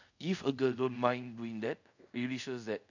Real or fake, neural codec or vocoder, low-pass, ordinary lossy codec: fake; codec, 24 kHz, 0.5 kbps, DualCodec; 7.2 kHz; none